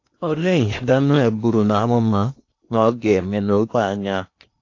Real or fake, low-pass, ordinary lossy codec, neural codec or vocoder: fake; 7.2 kHz; AAC, 48 kbps; codec, 16 kHz in and 24 kHz out, 0.8 kbps, FocalCodec, streaming, 65536 codes